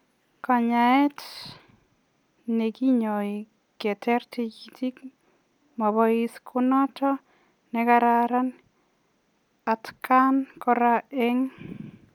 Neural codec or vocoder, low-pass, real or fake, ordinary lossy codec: none; 19.8 kHz; real; none